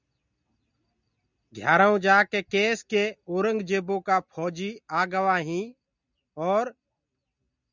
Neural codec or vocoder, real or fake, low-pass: none; real; 7.2 kHz